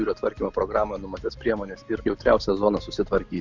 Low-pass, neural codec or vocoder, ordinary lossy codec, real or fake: 7.2 kHz; none; Opus, 64 kbps; real